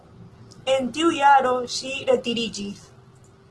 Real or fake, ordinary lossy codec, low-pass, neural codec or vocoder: real; Opus, 16 kbps; 10.8 kHz; none